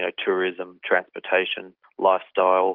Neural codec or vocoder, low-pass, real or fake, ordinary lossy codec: none; 5.4 kHz; real; Opus, 32 kbps